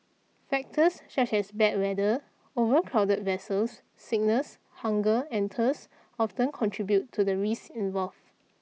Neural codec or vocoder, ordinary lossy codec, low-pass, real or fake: none; none; none; real